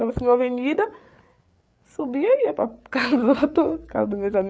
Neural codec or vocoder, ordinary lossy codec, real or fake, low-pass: codec, 16 kHz, 8 kbps, FreqCodec, larger model; none; fake; none